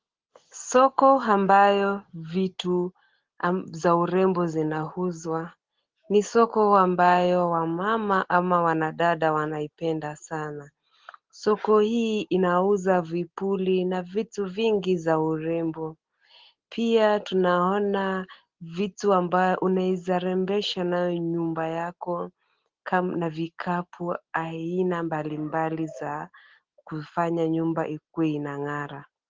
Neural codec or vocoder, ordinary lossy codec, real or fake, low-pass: none; Opus, 16 kbps; real; 7.2 kHz